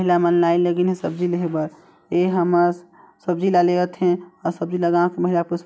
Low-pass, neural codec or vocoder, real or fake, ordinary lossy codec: none; none; real; none